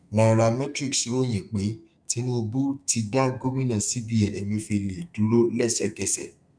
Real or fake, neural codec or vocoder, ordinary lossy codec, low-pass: fake; codec, 32 kHz, 1.9 kbps, SNAC; none; 9.9 kHz